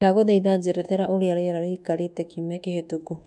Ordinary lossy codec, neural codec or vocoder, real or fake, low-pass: none; codec, 24 kHz, 1.2 kbps, DualCodec; fake; 10.8 kHz